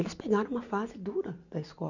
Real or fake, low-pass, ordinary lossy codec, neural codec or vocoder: real; 7.2 kHz; none; none